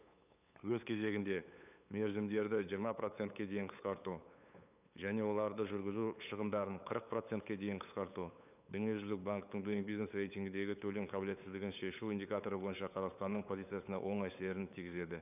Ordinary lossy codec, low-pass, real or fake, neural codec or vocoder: none; 3.6 kHz; fake; codec, 16 kHz, 8 kbps, FunCodec, trained on LibriTTS, 25 frames a second